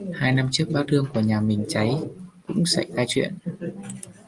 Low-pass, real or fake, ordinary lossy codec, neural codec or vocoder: 10.8 kHz; real; Opus, 32 kbps; none